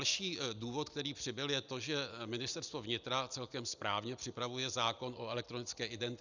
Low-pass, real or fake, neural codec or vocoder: 7.2 kHz; real; none